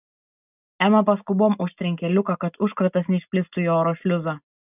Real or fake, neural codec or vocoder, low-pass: real; none; 3.6 kHz